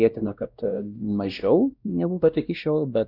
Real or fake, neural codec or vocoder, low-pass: fake; codec, 16 kHz, 1 kbps, X-Codec, WavLM features, trained on Multilingual LibriSpeech; 5.4 kHz